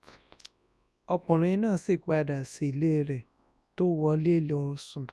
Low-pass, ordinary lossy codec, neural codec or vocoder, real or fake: none; none; codec, 24 kHz, 0.9 kbps, WavTokenizer, large speech release; fake